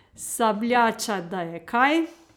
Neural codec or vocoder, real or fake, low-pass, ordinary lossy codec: none; real; none; none